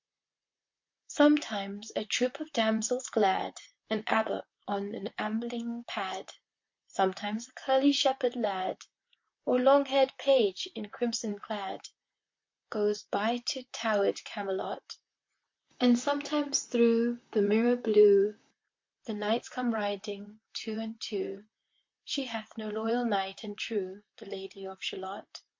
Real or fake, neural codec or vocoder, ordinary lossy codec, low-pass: fake; vocoder, 44.1 kHz, 128 mel bands, Pupu-Vocoder; MP3, 48 kbps; 7.2 kHz